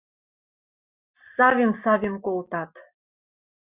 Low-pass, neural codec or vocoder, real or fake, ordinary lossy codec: 3.6 kHz; none; real; Opus, 64 kbps